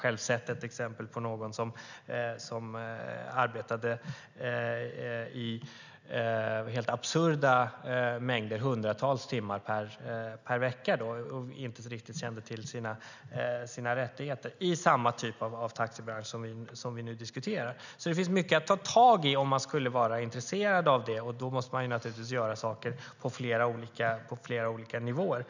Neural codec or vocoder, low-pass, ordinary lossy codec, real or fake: none; 7.2 kHz; none; real